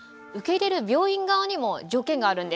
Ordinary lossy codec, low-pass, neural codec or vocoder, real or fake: none; none; none; real